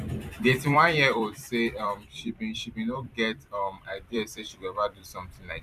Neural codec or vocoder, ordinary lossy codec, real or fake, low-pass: vocoder, 44.1 kHz, 128 mel bands every 256 samples, BigVGAN v2; none; fake; 14.4 kHz